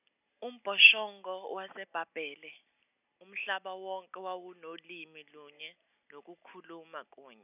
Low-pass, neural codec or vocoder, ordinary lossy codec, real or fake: 3.6 kHz; none; none; real